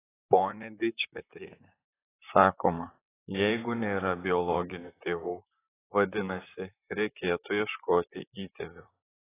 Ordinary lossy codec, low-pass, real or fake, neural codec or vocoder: AAC, 16 kbps; 3.6 kHz; fake; vocoder, 44.1 kHz, 128 mel bands, Pupu-Vocoder